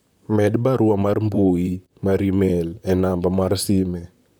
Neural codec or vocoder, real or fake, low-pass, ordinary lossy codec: vocoder, 44.1 kHz, 128 mel bands, Pupu-Vocoder; fake; none; none